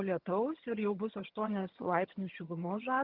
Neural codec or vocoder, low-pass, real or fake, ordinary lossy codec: vocoder, 22.05 kHz, 80 mel bands, HiFi-GAN; 5.4 kHz; fake; Opus, 24 kbps